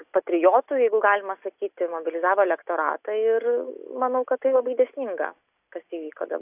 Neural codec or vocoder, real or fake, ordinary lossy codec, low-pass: none; real; AAC, 32 kbps; 3.6 kHz